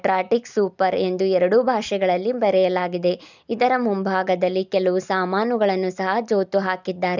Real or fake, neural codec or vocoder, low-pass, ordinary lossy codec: real; none; 7.2 kHz; none